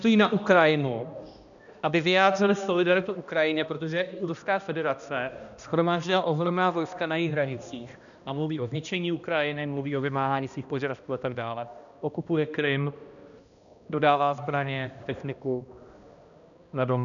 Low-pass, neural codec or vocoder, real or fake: 7.2 kHz; codec, 16 kHz, 1 kbps, X-Codec, HuBERT features, trained on balanced general audio; fake